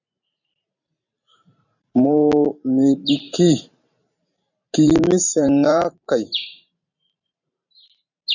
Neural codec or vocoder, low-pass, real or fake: vocoder, 44.1 kHz, 128 mel bands every 512 samples, BigVGAN v2; 7.2 kHz; fake